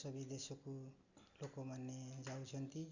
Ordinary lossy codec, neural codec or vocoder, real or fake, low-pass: Opus, 64 kbps; none; real; 7.2 kHz